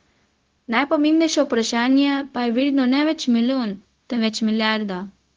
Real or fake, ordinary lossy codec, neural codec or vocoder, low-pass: fake; Opus, 16 kbps; codec, 16 kHz, 0.4 kbps, LongCat-Audio-Codec; 7.2 kHz